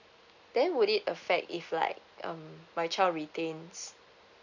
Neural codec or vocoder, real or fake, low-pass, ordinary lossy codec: none; real; 7.2 kHz; none